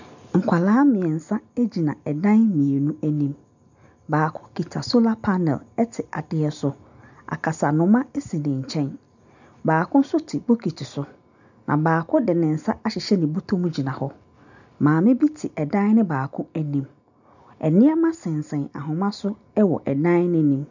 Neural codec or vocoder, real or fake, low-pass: none; real; 7.2 kHz